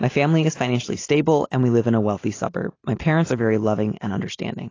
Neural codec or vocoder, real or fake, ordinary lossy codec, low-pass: none; real; AAC, 32 kbps; 7.2 kHz